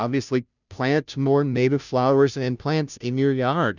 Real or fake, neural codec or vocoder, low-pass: fake; codec, 16 kHz, 0.5 kbps, FunCodec, trained on Chinese and English, 25 frames a second; 7.2 kHz